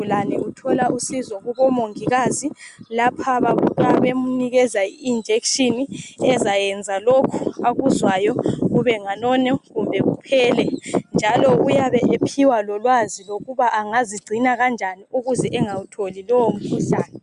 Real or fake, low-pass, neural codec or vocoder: real; 10.8 kHz; none